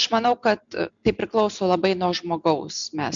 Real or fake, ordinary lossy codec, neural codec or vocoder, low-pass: real; MP3, 64 kbps; none; 7.2 kHz